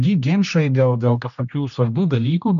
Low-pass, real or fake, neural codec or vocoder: 7.2 kHz; fake; codec, 16 kHz, 1 kbps, X-Codec, HuBERT features, trained on general audio